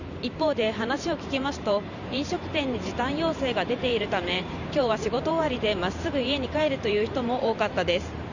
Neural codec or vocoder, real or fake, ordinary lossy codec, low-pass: vocoder, 44.1 kHz, 128 mel bands every 512 samples, BigVGAN v2; fake; none; 7.2 kHz